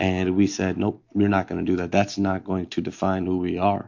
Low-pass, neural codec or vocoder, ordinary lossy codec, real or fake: 7.2 kHz; none; MP3, 48 kbps; real